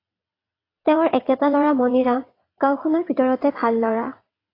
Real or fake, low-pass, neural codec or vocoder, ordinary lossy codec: fake; 5.4 kHz; vocoder, 22.05 kHz, 80 mel bands, WaveNeXt; AAC, 24 kbps